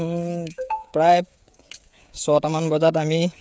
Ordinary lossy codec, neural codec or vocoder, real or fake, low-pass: none; codec, 16 kHz, 16 kbps, FreqCodec, smaller model; fake; none